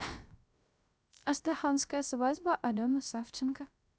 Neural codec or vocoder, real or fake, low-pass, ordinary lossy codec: codec, 16 kHz, 0.3 kbps, FocalCodec; fake; none; none